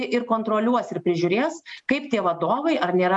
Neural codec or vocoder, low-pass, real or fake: none; 10.8 kHz; real